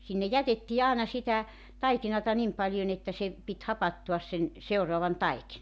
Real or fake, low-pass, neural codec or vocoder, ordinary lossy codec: real; none; none; none